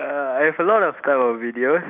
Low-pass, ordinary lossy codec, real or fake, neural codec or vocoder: 3.6 kHz; none; real; none